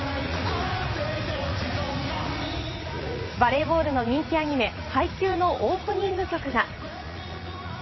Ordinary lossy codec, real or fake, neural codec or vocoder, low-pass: MP3, 24 kbps; fake; vocoder, 44.1 kHz, 80 mel bands, Vocos; 7.2 kHz